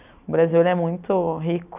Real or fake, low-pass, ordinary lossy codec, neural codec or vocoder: real; 3.6 kHz; none; none